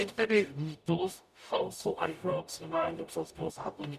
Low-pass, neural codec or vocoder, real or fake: 14.4 kHz; codec, 44.1 kHz, 0.9 kbps, DAC; fake